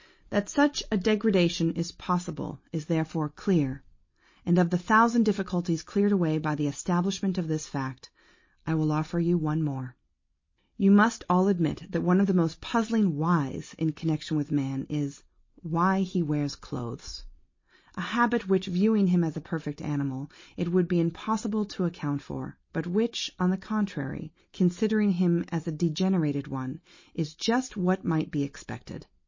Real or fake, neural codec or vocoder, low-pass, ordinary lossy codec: real; none; 7.2 kHz; MP3, 32 kbps